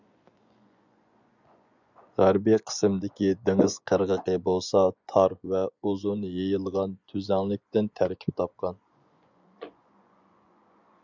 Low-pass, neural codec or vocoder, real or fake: 7.2 kHz; vocoder, 24 kHz, 100 mel bands, Vocos; fake